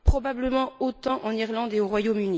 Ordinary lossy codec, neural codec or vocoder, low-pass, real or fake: none; none; none; real